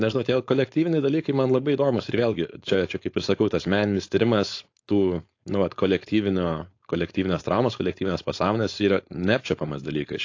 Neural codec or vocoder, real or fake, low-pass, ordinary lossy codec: codec, 16 kHz, 4.8 kbps, FACodec; fake; 7.2 kHz; AAC, 48 kbps